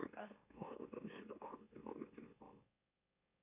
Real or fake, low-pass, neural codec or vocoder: fake; 3.6 kHz; autoencoder, 44.1 kHz, a latent of 192 numbers a frame, MeloTTS